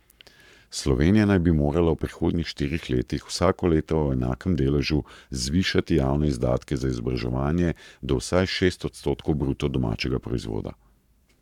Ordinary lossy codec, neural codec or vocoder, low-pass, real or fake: none; codec, 44.1 kHz, 7.8 kbps, Pupu-Codec; 19.8 kHz; fake